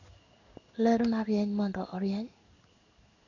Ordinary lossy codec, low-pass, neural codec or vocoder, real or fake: none; 7.2 kHz; codec, 16 kHz in and 24 kHz out, 1 kbps, XY-Tokenizer; fake